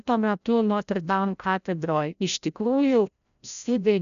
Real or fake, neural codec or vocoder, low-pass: fake; codec, 16 kHz, 0.5 kbps, FreqCodec, larger model; 7.2 kHz